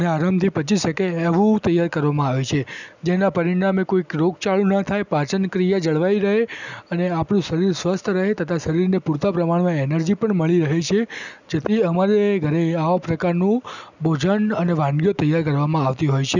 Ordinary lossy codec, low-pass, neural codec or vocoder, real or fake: none; 7.2 kHz; none; real